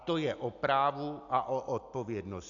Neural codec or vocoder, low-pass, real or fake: none; 7.2 kHz; real